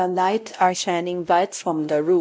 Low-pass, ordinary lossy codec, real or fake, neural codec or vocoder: none; none; fake; codec, 16 kHz, 0.5 kbps, X-Codec, WavLM features, trained on Multilingual LibriSpeech